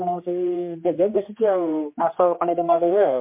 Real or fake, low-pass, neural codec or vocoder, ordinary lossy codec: fake; 3.6 kHz; codec, 16 kHz, 2 kbps, X-Codec, HuBERT features, trained on general audio; MP3, 24 kbps